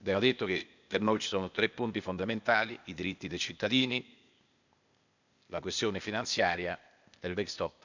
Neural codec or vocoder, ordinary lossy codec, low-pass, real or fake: codec, 16 kHz, 0.8 kbps, ZipCodec; none; 7.2 kHz; fake